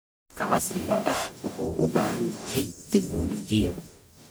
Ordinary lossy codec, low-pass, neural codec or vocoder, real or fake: none; none; codec, 44.1 kHz, 0.9 kbps, DAC; fake